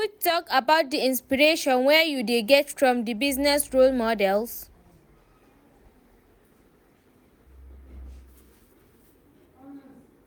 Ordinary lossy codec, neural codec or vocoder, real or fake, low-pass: none; none; real; none